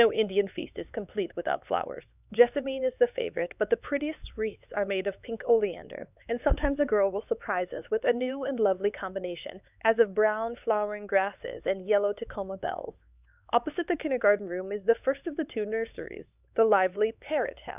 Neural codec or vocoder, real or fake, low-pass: codec, 16 kHz, 4 kbps, X-Codec, HuBERT features, trained on LibriSpeech; fake; 3.6 kHz